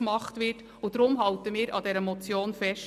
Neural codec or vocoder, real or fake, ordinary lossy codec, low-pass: none; real; MP3, 96 kbps; 14.4 kHz